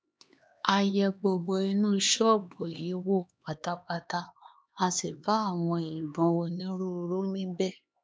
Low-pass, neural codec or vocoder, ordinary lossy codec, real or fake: none; codec, 16 kHz, 2 kbps, X-Codec, HuBERT features, trained on LibriSpeech; none; fake